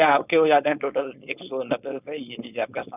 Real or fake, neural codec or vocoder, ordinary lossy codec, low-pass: fake; codec, 16 kHz, 4.8 kbps, FACodec; none; 3.6 kHz